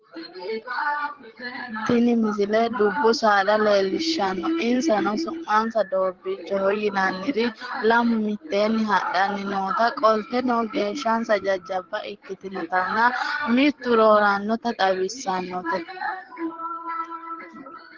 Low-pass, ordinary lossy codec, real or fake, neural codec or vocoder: 7.2 kHz; Opus, 16 kbps; fake; codec, 16 kHz, 8 kbps, FreqCodec, larger model